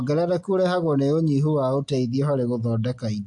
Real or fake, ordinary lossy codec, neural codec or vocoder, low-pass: real; AAC, 64 kbps; none; 10.8 kHz